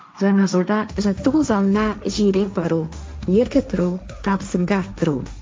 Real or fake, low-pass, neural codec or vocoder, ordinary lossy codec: fake; none; codec, 16 kHz, 1.1 kbps, Voila-Tokenizer; none